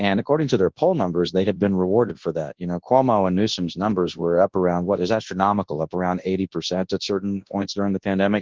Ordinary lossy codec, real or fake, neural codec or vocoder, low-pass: Opus, 16 kbps; fake; codec, 24 kHz, 0.9 kbps, WavTokenizer, large speech release; 7.2 kHz